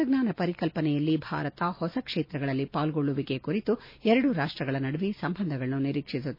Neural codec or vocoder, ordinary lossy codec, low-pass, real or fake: none; MP3, 24 kbps; 5.4 kHz; real